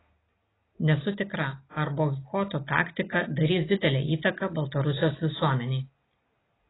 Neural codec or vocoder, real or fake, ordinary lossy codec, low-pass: none; real; AAC, 16 kbps; 7.2 kHz